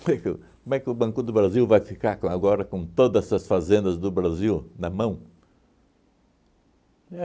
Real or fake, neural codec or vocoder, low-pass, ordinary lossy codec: real; none; none; none